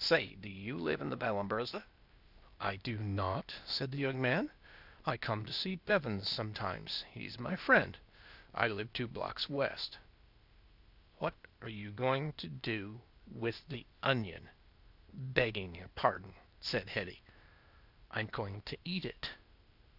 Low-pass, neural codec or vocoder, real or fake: 5.4 kHz; codec, 16 kHz, 0.8 kbps, ZipCodec; fake